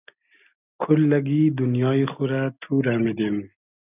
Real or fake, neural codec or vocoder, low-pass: real; none; 3.6 kHz